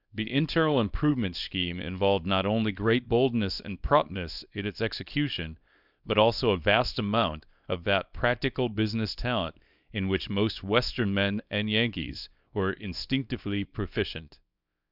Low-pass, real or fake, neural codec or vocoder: 5.4 kHz; fake; codec, 24 kHz, 0.9 kbps, WavTokenizer, small release